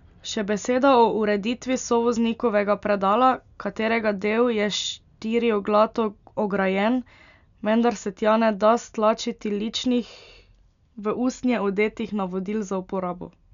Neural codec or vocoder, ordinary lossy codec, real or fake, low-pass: none; none; real; 7.2 kHz